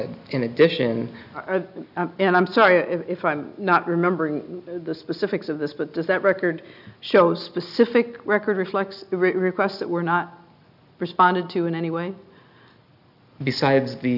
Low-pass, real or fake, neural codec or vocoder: 5.4 kHz; real; none